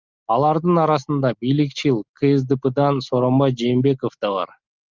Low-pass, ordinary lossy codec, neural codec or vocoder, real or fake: 7.2 kHz; Opus, 24 kbps; none; real